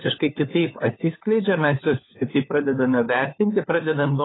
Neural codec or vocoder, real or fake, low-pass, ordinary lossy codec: codec, 16 kHz, 4 kbps, FunCodec, trained on LibriTTS, 50 frames a second; fake; 7.2 kHz; AAC, 16 kbps